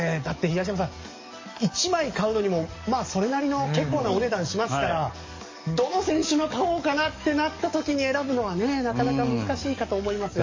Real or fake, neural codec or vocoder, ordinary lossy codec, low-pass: fake; codec, 44.1 kHz, 7.8 kbps, DAC; MP3, 32 kbps; 7.2 kHz